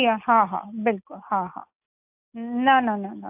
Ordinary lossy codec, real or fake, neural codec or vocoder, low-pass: AAC, 32 kbps; real; none; 3.6 kHz